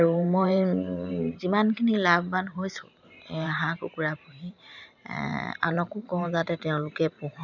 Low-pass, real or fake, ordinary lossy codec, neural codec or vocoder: 7.2 kHz; fake; none; vocoder, 44.1 kHz, 128 mel bands every 512 samples, BigVGAN v2